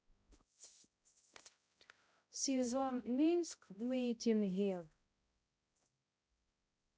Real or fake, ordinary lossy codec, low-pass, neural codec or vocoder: fake; none; none; codec, 16 kHz, 0.5 kbps, X-Codec, HuBERT features, trained on balanced general audio